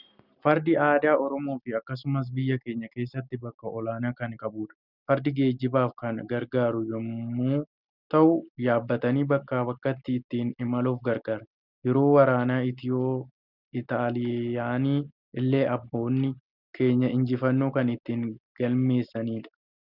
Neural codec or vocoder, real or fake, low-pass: none; real; 5.4 kHz